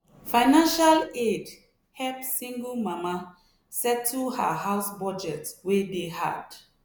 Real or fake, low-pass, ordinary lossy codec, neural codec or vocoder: real; none; none; none